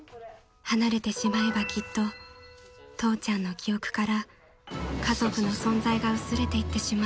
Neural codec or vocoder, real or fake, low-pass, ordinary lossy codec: none; real; none; none